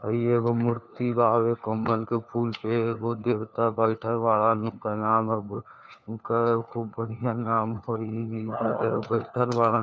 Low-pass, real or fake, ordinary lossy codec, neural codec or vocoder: none; fake; none; codec, 16 kHz, 4 kbps, FunCodec, trained on LibriTTS, 50 frames a second